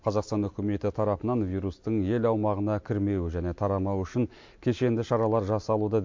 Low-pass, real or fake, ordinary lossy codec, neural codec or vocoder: 7.2 kHz; real; MP3, 48 kbps; none